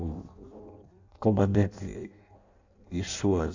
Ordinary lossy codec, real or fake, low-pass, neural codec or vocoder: AAC, 48 kbps; fake; 7.2 kHz; codec, 16 kHz in and 24 kHz out, 0.6 kbps, FireRedTTS-2 codec